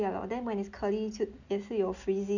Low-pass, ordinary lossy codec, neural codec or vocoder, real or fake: 7.2 kHz; none; none; real